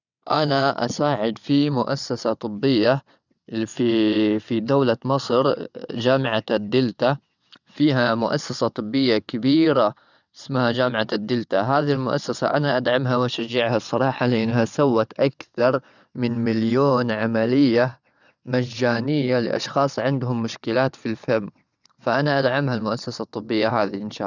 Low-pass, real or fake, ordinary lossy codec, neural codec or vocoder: 7.2 kHz; fake; none; vocoder, 22.05 kHz, 80 mel bands, WaveNeXt